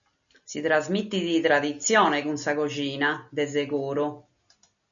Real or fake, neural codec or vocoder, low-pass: real; none; 7.2 kHz